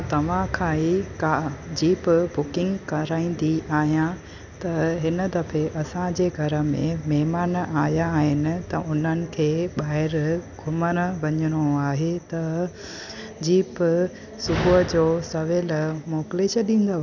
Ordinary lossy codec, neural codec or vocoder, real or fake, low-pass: none; none; real; 7.2 kHz